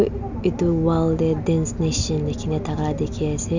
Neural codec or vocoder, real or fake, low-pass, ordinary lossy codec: none; real; 7.2 kHz; none